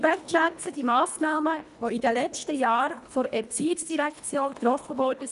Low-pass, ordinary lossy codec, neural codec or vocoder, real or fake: 10.8 kHz; none; codec, 24 kHz, 1.5 kbps, HILCodec; fake